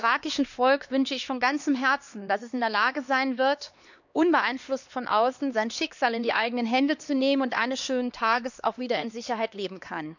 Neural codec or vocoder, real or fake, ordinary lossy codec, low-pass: codec, 16 kHz, 2 kbps, X-Codec, HuBERT features, trained on LibriSpeech; fake; none; 7.2 kHz